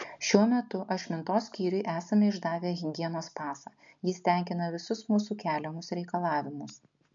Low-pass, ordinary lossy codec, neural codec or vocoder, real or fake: 7.2 kHz; MP3, 64 kbps; none; real